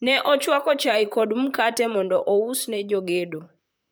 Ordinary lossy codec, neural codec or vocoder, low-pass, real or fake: none; vocoder, 44.1 kHz, 128 mel bands, Pupu-Vocoder; none; fake